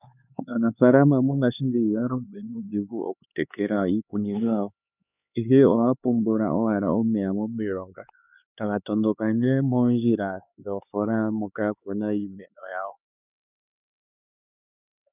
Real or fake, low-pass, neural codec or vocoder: fake; 3.6 kHz; codec, 16 kHz, 4 kbps, X-Codec, HuBERT features, trained on LibriSpeech